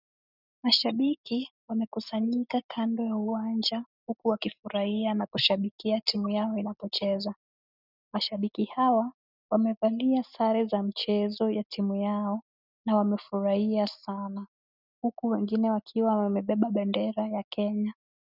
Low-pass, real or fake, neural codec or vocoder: 5.4 kHz; real; none